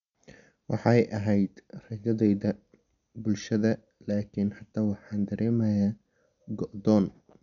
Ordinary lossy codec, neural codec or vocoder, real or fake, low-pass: none; none; real; 7.2 kHz